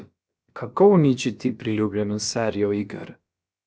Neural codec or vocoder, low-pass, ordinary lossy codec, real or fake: codec, 16 kHz, about 1 kbps, DyCAST, with the encoder's durations; none; none; fake